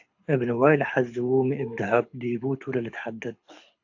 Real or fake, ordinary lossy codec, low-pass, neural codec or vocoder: fake; AAC, 48 kbps; 7.2 kHz; codec, 24 kHz, 6 kbps, HILCodec